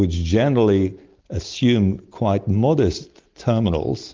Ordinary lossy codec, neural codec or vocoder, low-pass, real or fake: Opus, 32 kbps; none; 7.2 kHz; real